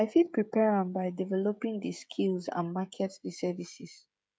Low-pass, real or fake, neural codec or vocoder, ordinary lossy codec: none; fake; codec, 16 kHz, 16 kbps, FreqCodec, smaller model; none